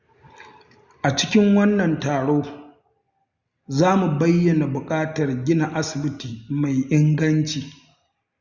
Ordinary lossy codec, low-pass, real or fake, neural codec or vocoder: Opus, 64 kbps; 7.2 kHz; real; none